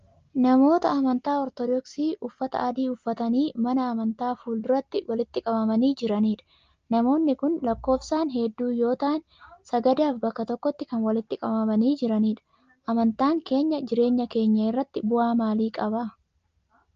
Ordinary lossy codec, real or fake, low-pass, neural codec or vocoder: Opus, 24 kbps; real; 7.2 kHz; none